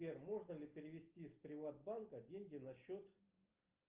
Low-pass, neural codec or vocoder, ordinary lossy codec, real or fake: 3.6 kHz; none; Opus, 32 kbps; real